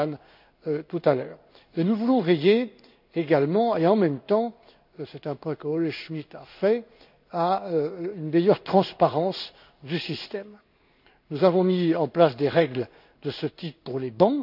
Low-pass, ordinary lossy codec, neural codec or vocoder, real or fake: 5.4 kHz; none; codec, 16 kHz in and 24 kHz out, 1 kbps, XY-Tokenizer; fake